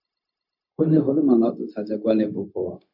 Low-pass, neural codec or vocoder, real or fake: 5.4 kHz; codec, 16 kHz, 0.4 kbps, LongCat-Audio-Codec; fake